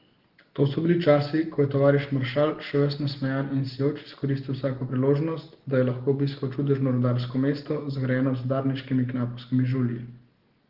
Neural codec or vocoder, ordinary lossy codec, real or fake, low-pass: none; Opus, 16 kbps; real; 5.4 kHz